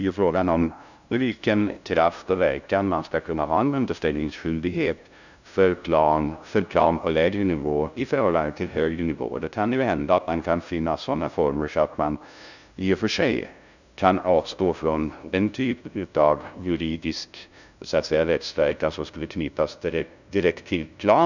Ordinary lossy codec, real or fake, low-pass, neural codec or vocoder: none; fake; 7.2 kHz; codec, 16 kHz, 0.5 kbps, FunCodec, trained on LibriTTS, 25 frames a second